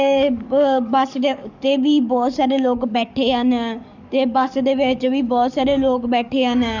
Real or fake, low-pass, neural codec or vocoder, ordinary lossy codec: fake; 7.2 kHz; codec, 44.1 kHz, 7.8 kbps, DAC; none